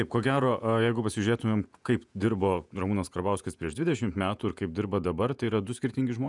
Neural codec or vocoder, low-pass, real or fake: vocoder, 48 kHz, 128 mel bands, Vocos; 10.8 kHz; fake